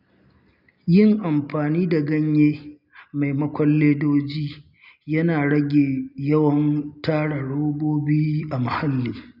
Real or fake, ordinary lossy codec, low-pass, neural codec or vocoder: real; none; 5.4 kHz; none